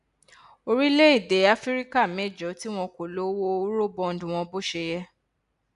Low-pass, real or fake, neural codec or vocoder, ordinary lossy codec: 10.8 kHz; real; none; none